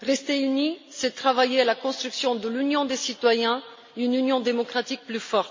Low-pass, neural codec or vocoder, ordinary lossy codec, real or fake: 7.2 kHz; none; MP3, 32 kbps; real